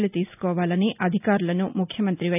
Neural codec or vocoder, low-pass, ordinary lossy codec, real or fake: none; 3.6 kHz; none; real